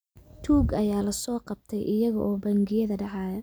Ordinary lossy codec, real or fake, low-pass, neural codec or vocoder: none; real; none; none